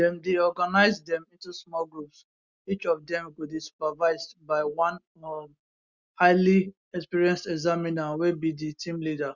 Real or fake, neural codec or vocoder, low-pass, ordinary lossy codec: real; none; 7.2 kHz; Opus, 64 kbps